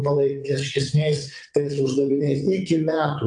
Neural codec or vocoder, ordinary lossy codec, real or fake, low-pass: vocoder, 22.05 kHz, 80 mel bands, WaveNeXt; AAC, 64 kbps; fake; 9.9 kHz